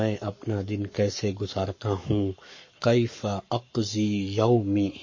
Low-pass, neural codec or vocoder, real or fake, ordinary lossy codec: 7.2 kHz; codec, 44.1 kHz, 7.8 kbps, Pupu-Codec; fake; MP3, 32 kbps